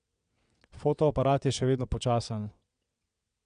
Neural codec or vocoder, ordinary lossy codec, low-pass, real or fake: vocoder, 22.05 kHz, 80 mel bands, WaveNeXt; none; 9.9 kHz; fake